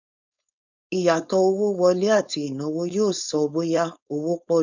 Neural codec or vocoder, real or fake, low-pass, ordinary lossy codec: codec, 16 kHz, 4.8 kbps, FACodec; fake; 7.2 kHz; none